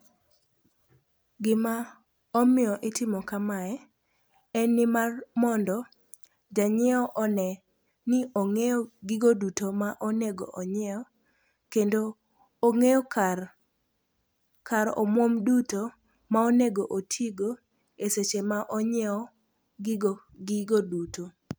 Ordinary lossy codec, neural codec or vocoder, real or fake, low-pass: none; none; real; none